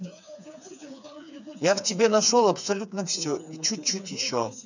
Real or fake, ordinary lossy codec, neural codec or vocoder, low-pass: fake; none; codec, 16 kHz, 4 kbps, FreqCodec, smaller model; 7.2 kHz